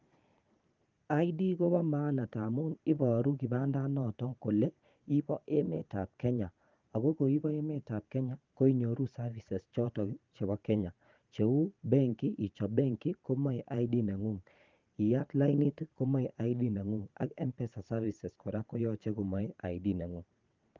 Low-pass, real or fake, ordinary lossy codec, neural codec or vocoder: 7.2 kHz; fake; Opus, 32 kbps; vocoder, 44.1 kHz, 128 mel bands every 512 samples, BigVGAN v2